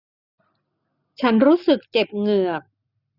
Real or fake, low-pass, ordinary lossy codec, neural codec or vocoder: real; 5.4 kHz; none; none